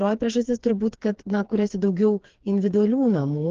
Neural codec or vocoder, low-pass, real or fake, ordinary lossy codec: codec, 16 kHz, 4 kbps, FreqCodec, smaller model; 7.2 kHz; fake; Opus, 16 kbps